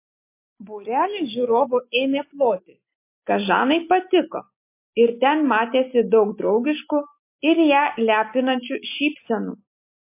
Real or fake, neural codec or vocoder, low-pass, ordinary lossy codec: real; none; 3.6 kHz; MP3, 24 kbps